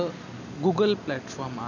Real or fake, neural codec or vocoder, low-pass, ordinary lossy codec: real; none; 7.2 kHz; none